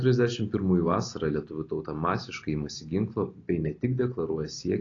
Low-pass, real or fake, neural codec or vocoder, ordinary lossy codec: 7.2 kHz; real; none; AAC, 32 kbps